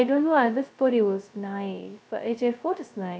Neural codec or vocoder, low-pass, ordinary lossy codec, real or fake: codec, 16 kHz, 0.2 kbps, FocalCodec; none; none; fake